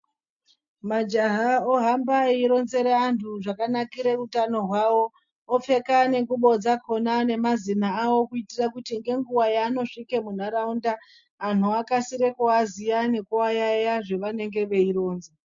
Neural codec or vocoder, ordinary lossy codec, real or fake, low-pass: none; MP3, 48 kbps; real; 7.2 kHz